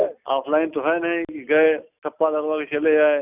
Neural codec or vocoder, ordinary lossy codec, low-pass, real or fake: none; none; 3.6 kHz; real